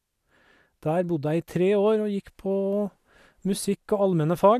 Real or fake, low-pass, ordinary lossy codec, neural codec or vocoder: real; 14.4 kHz; none; none